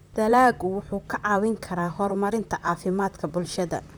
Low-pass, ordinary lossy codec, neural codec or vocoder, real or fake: none; none; vocoder, 44.1 kHz, 128 mel bands, Pupu-Vocoder; fake